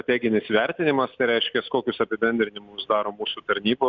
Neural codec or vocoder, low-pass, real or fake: none; 7.2 kHz; real